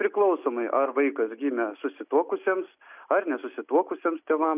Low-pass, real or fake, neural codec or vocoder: 3.6 kHz; real; none